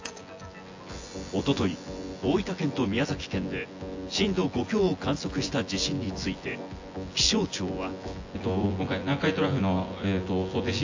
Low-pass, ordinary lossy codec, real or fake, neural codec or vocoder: 7.2 kHz; AAC, 48 kbps; fake; vocoder, 24 kHz, 100 mel bands, Vocos